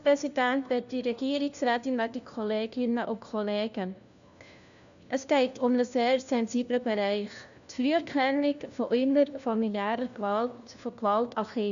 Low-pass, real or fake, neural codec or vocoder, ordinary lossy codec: 7.2 kHz; fake; codec, 16 kHz, 1 kbps, FunCodec, trained on LibriTTS, 50 frames a second; none